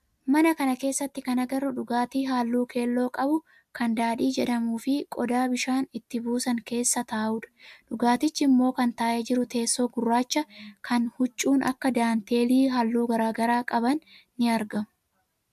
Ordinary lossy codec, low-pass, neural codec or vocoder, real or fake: AAC, 96 kbps; 14.4 kHz; none; real